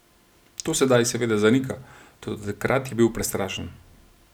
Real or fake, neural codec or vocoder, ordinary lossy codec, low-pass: real; none; none; none